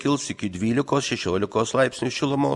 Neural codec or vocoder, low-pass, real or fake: none; 10.8 kHz; real